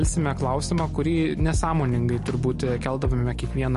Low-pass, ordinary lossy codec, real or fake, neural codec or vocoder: 14.4 kHz; MP3, 48 kbps; real; none